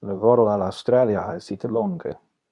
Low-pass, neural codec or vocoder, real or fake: 10.8 kHz; codec, 24 kHz, 0.9 kbps, WavTokenizer, medium speech release version 2; fake